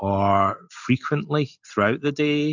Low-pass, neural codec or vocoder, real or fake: 7.2 kHz; none; real